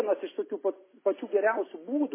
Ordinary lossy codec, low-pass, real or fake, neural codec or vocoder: MP3, 16 kbps; 3.6 kHz; real; none